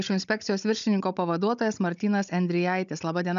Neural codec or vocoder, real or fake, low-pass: codec, 16 kHz, 16 kbps, FunCodec, trained on Chinese and English, 50 frames a second; fake; 7.2 kHz